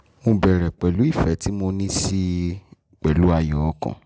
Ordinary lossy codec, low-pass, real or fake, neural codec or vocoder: none; none; real; none